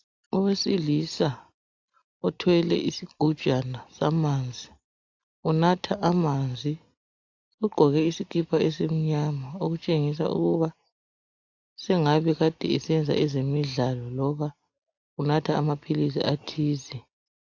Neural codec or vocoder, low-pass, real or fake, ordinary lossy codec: none; 7.2 kHz; real; AAC, 48 kbps